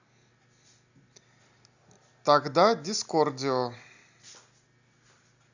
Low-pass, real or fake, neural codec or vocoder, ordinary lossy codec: 7.2 kHz; real; none; Opus, 64 kbps